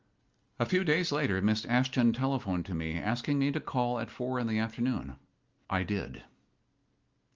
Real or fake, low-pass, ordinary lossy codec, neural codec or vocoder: real; 7.2 kHz; Opus, 32 kbps; none